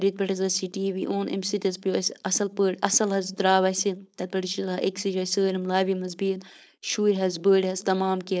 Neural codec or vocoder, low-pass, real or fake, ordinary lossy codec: codec, 16 kHz, 4.8 kbps, FACodec; none; fake; none